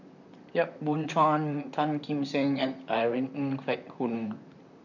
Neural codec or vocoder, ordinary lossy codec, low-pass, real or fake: vocoder, 44.1 kHz, 128 mel bands, Pupu-Vocoder; none; 7.2 kHz; fake